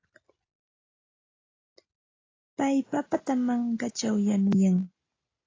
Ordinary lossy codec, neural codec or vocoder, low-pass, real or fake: AAC, 32 kbps; none; 7.2 kHz; real